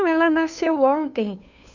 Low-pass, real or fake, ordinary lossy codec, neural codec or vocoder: 7.2 kHz; fake; none; codec, 16 kHz, 2 kbps, FunCodec, trained on Chinese and English, 25 frames a second